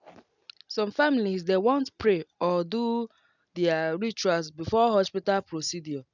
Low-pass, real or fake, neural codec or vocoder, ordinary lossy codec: 7.2 kHz; real; none; none